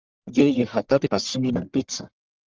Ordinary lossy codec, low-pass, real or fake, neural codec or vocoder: Opus, 32 kbps; 7.2 kHz; fake; codec, 44.1 kHz, 1.7 kbps, Pupu-Codec